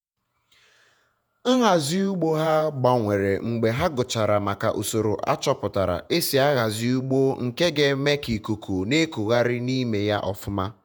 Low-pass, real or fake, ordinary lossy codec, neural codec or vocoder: none; fake; none; vocoder, 48 kHz, 128 mel bands, Vocos